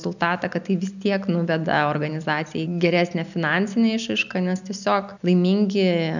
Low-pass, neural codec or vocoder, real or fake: 7.2 kHz; none; real